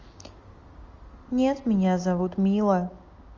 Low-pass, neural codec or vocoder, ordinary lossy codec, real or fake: 7.2 kHz; none; Opus, 32 kbps; real